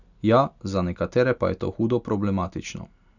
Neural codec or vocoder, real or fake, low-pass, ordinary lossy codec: none; real; 7.2 kHz; none